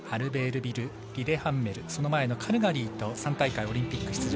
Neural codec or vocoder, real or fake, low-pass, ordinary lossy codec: none; real; none; none